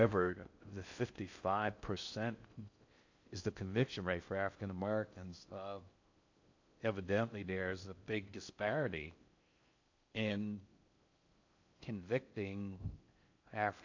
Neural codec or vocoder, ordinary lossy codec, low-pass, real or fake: codec, 16 kHz in and 24 kHz out, 0.6 kbps, FocalCodec, streaming, 4096 codes; AAC, 48 kbps; 7.2 kHz; fake